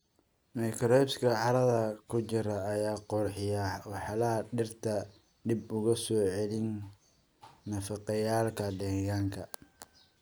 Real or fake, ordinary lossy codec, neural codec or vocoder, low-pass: real; none; none; none